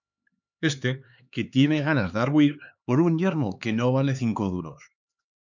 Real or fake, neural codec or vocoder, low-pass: fake; codec, 16 kHz, 4 kbps, X-Codec, HuBERT features, trained on LibriSpeech; 7.2 kHz